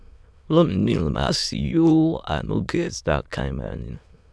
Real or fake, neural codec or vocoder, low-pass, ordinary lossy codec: fake; autoencoder, 22.05 kHz, a latent of 192 numbers a frame, VITS, trained on many speakers; none; none